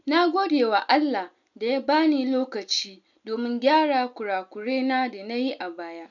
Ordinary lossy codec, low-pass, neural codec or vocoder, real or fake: none; 7.2 kHz; none; real